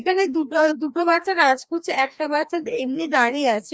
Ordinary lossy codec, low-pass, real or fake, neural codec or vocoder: none; none; fake; codec, 16 kHz, 1 kbps, FreqCodec, larger model